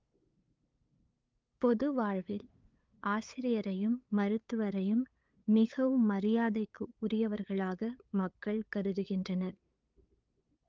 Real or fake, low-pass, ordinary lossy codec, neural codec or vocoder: fake; 7.2 kHz; Opus, 32 kbps; codec, 16 kHz, 8 kbps, FunCodec, trained on LibriTTS, 25 frames a second